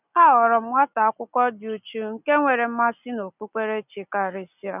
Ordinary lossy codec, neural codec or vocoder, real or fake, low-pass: none; none; real; 3.6 kHz